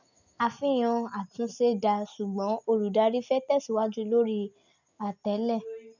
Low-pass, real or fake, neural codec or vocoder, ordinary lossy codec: 7.2 kHz; real; none; none